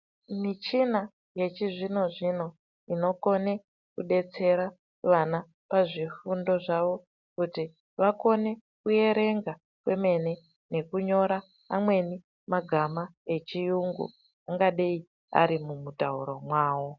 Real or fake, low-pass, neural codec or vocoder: real; 7.2 kHz; none